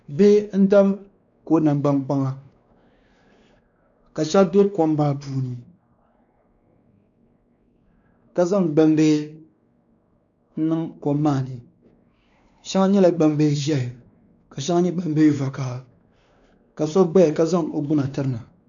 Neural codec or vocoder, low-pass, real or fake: codec, 16 kHz, 2 kbps, X-Codec, WavLM features, trained on Multilingual LibriSpeech; 7.2 kHz; fake